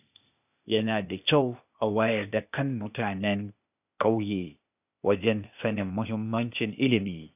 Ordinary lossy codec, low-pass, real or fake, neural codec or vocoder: none; 3.6 kHz; fake; codec, 16 kHz, 0.8 kbps, ZipCodec